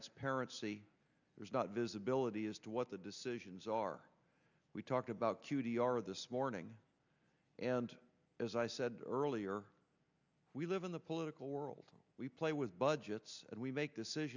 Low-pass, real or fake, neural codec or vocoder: 7.2 kHz; real; none